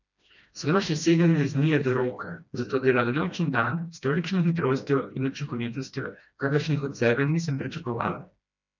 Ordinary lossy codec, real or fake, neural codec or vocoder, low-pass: none; fake; codec, 16 kHz, 1 kbps, FreqCodec, smaller model; 7.2 kHz